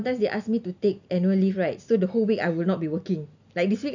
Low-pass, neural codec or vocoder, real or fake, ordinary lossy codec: 7.2 kHz; none; real; none